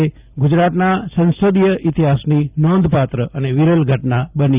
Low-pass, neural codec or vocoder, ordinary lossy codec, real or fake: 3.6 kHz; none; Opus, 24 kbps; real